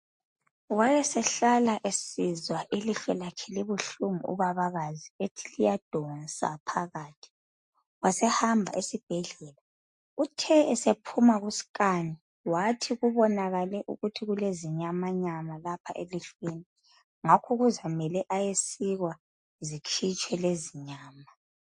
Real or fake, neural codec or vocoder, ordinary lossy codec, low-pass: real; none; MP3, 48 kbps; 10.8 kHz